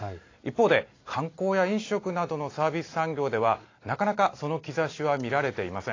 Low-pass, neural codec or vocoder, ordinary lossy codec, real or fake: 7.2 kHz; none; AAC, 32 kbps; real